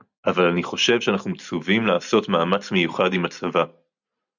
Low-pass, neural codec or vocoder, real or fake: 7.2 kHz; none; real